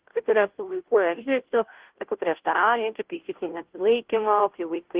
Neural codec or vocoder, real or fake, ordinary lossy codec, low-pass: codec, 16 kHz, 0.5 kbps, FunCodec, trained on Chinese and English, 25 frames a second; fake; Opus, 16 kbps; 3.6 kHz